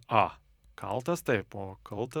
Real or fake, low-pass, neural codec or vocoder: fake; 19.8 kHz; vocoder, 44.1 kHz, 128 mel bands every 256 samples, BigVGAN v2